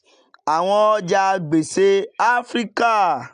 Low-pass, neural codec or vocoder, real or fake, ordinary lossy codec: 9.9 kHz; none; real; AAC, 96 kbps